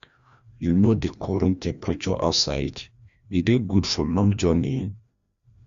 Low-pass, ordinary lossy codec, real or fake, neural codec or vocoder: 7.2 kHz; Opus, 64 kbps; fake; codec, 16 kHz, 1 kbps, FreqCodec, larger model